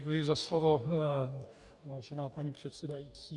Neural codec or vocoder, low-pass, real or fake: codec, 44.1 kHz, 2.6 kbps, DAC; 10.8 kHz; fake